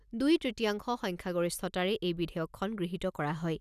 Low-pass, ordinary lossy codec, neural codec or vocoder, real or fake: 14.4 kHz; none; none; real